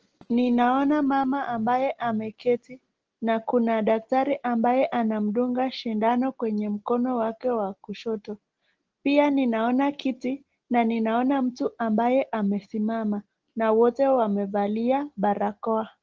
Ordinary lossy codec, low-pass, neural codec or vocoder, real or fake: Opus, 16 kbps; 7.2 kHz; none; real